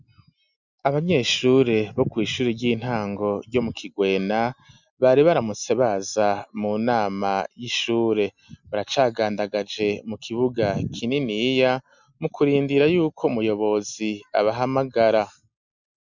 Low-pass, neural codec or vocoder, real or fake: 7.2 kHz; none; real